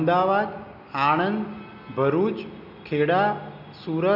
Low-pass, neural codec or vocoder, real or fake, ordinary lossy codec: 5.4 kHz; none; real; none